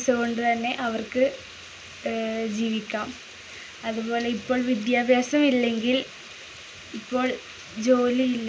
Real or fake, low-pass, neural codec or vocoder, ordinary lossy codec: real; none; none; none